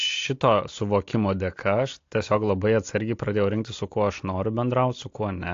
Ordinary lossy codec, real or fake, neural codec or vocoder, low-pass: AAC, 48 kbps; real; none; 7.2 kHz